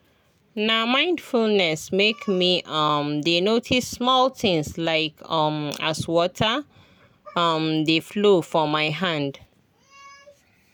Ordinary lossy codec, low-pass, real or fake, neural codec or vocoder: none; none; real; none